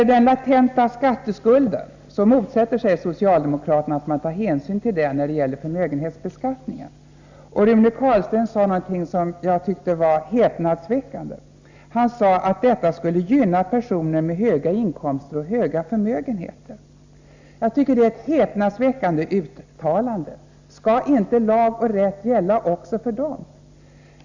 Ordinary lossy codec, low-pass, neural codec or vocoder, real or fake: Opus, 64 kbps; 7.2 kHz; none; real